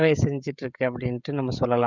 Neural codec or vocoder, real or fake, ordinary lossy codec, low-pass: codec, 16 kHz, 16 kbps, FreqCodec, smaller model; fake; none; 7.2 kHz